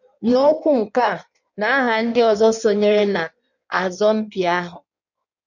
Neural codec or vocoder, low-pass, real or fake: codec, 16 kHz in and 24 kHz out, 1.1 kbps, FireRedTTS-2 codec; 7.2 kHz; fake